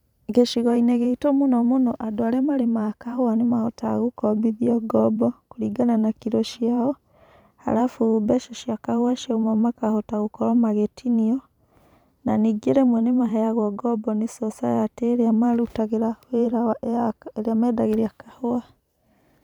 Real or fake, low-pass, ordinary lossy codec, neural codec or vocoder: fake; 19.8 kHz; none; vocoder, 44.1 kHz, 128 mel bands every 512 samples, BigVGAN v2